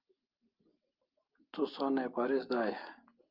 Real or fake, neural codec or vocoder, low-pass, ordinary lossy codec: real; none; 5.4 kHz; Opus, 24 kbps